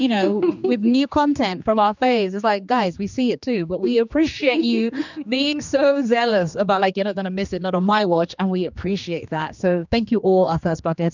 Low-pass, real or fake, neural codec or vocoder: 7.2 kHz; fake; codec, 16 kHz, 2 kbps, X-Codec, HuBERT features, trained on general audio